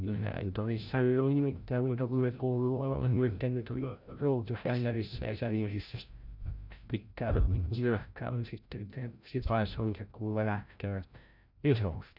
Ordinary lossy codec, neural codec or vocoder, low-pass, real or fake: none; codec, 16 kHz, 0.5 kbps, FreqCodec, larger model; 5.4 kHz; fake